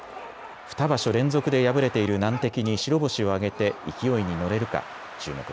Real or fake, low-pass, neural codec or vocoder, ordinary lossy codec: real; none; none; none